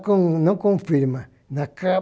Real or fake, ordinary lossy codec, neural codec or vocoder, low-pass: real; none; none; none